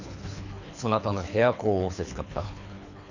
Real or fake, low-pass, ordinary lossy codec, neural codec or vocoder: fake; 7.2 kHz; none; codec, 24 kHz, 3 kbps, HILCodec